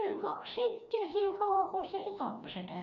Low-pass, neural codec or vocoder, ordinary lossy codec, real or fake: 7.2 kHz; codec, 16 kHz, 1 kbps, FreqCodec, larger model; AAC, 64 kbps; fake